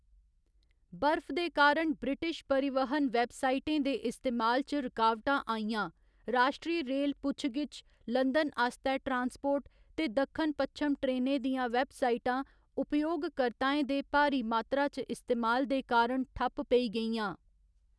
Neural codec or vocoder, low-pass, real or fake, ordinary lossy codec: none; none; real; none